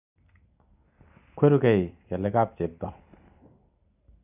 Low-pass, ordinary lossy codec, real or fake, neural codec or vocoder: 3.6 kHz; none; real; none